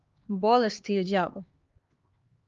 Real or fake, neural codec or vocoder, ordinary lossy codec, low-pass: fake; codec, 16 kHz, 2 kbps, X-Codec, HuBERT features, trained on LibriSpeech; Opus, 32 kbps; 7.2 kHz